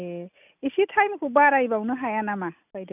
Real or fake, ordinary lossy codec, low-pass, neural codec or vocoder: real; none; 3.6 kHz; none